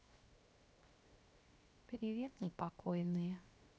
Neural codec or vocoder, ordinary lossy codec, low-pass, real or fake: codec, 16 kHz, 0.7 kbps, FocalCodec; none; none; fake